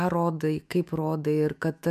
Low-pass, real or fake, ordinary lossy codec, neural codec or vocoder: 14.4 kHz; fake; MP3, 96 kbps; autoencoder, 48 kHz, 128 numbers a frame, DAC-VAE, trained on Japanese speech